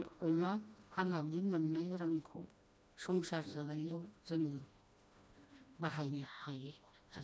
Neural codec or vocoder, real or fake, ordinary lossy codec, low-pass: codec, 16 kHz, 1 kbps, FreqCodec, smaller model; fake; none; none